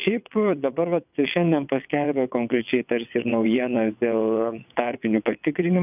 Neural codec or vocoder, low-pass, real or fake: vocoder, 22.05 kHz, 80 mel bands, WaveNeXt; 3.6 kHz; fake